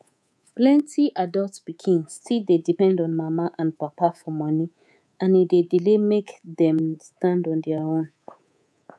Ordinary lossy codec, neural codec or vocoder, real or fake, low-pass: none; codec, 24 kHz, 3.1 kbps, DualCodec; fake; none